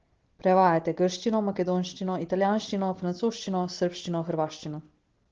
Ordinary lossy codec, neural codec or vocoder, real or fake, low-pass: Opus, 16 kbps; none; real; 7.2 kHz